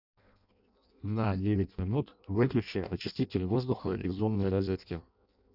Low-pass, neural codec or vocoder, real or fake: 5.4 kHz; codec, 16 kHz in and 24 kHz out, 0.6 kbps, FireRedTTS-2 codec; fake